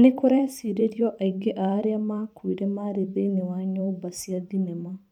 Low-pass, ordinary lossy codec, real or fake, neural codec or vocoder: 19.8 kHz; none; fake; vocoder, 44.1 kHz, 128 mel bands every 512 samples, BigVGAN v2